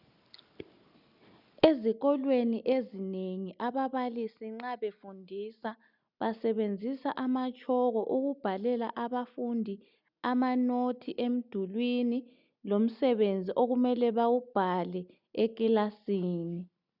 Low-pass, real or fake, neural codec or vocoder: 5.4 kHz; real; none